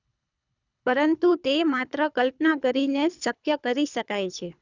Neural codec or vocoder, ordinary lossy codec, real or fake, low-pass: codec, 24 kHz, 3 kbps, HILCodec; none; fake; 7.2 kHz